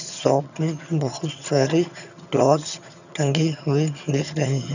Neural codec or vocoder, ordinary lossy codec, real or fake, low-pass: vocoder, 22.05 kHz, 80 mel bands, HiFi-GAN; none; fake; 7.2 kHz